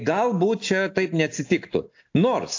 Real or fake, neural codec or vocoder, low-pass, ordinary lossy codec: real; none; 7.2 kHz; AAC, 48 kbps